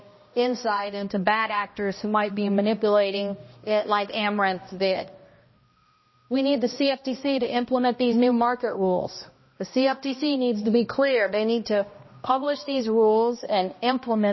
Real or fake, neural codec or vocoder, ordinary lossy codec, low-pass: fake; codec, 16 kHz, 1 kbps, X-Codec, HuBERT features, trained on balanced general audio; MP3, 24 kbps; 7.2 kHz